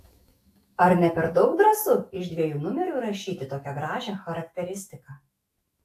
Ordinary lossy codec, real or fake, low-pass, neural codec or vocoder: AAC, 64 kbps; fake; 14.4 kHz; autoencoder, 48 kHz, 128 numbers a frame, DAC-VAE, trained on Japanese speech